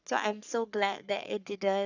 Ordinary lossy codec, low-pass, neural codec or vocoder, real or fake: none; 7.2 kHz; codec, 44.1 kHz, 3.4 kbps, Pupu-Codec; fake